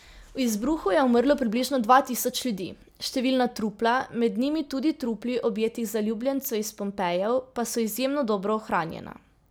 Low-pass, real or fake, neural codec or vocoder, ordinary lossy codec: none; real; none; none